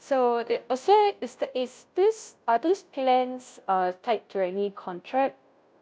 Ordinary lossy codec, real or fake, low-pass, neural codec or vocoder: none; fake; none; codec, 16 kHz, 0.5 kbps, FunCodec, trained on Chinese and English, 25 frames a second